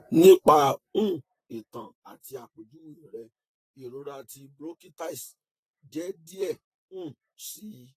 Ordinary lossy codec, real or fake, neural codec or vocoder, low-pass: AAC, 48 kbps; fake; vocoder, 44.1 kHz, 128 mel bands, Pupu-Vocoder; 14.4 kHz